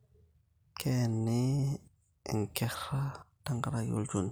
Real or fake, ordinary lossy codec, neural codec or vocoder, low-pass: real; none; none; none